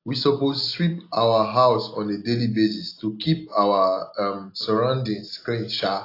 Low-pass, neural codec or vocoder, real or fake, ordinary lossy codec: 5.4 kHz; none; real; AAC, 32 kbps